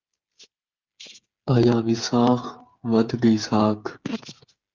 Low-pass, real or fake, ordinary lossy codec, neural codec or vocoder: 7.2 kHz; fake; Opus, 24 kbps; codec, 16 kHz, 8 kbps, FreqCodec, smaller model